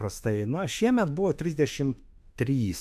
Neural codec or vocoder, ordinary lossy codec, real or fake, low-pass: autoencoder, 48 kHz, 32 numbers a frame, DAC-VAE, trained on Japanese speech; AAC, 96 kbps; fake; 14.4 kHz